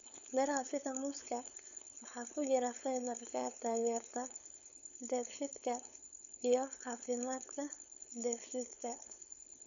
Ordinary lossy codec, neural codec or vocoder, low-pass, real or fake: none; codec, 16 kHz, 4.8 kbps, FACodec; 7.2 kHz; fake